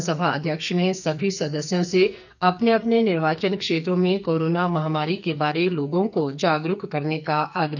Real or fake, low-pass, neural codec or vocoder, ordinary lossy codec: fake; 7.2 kHz; codec, 44.1 kHz, 2.6 kbps, SNAC; none